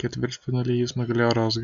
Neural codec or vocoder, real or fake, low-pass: none; real; 7.2 kHz